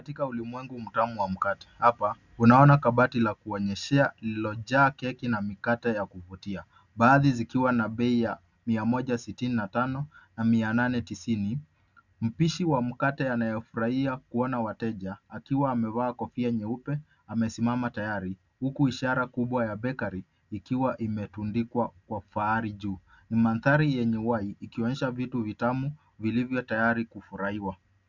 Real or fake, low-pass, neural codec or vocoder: real; 7.2 kHz; none